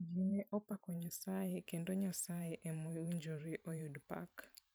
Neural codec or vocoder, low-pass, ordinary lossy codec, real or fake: vocoder, 44.1 kHz, 128 mel bands every 512 samples, BigVGAN v2; none; none; fake